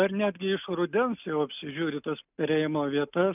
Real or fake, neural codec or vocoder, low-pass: real; none; 3.6 kHz